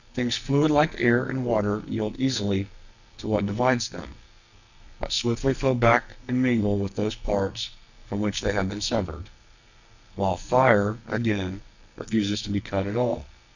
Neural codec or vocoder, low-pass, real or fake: codec, 44.1 kHz, 2.6 kbps, SNAC; 7.2 kHz; fake